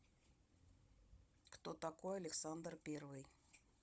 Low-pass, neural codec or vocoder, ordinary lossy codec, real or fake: none; codec, 16 kHz, 16 kbps, FunCodec, trained on Chinese and English, 50 frames a second; none; fake